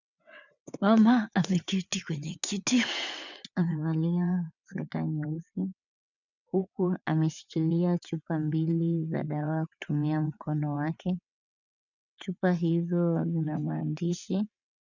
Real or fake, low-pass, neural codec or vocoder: fake; 7.2 kHz; vocoder, 22.05 kHz, 80 mel bands, WaveNeXt